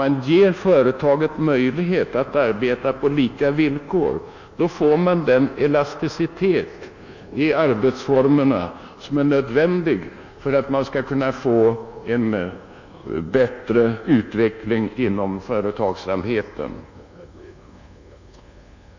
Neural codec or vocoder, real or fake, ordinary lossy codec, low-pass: codec, 24 kHz, 1.2 kbps, DualCodec; fake; none; 7.2 kHz